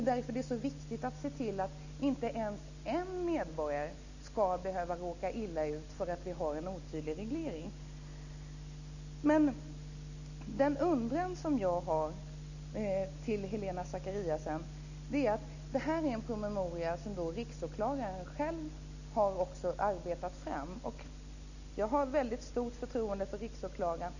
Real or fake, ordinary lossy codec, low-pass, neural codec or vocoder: real; none; 7.2 kHz; none